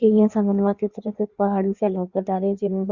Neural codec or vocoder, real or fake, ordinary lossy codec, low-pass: codec, 16 kHz in and 24 kHz out, 1.1 kbps, FireRedTTS-2 codec; fake; Opus, 64 kbps; 7.2 kHz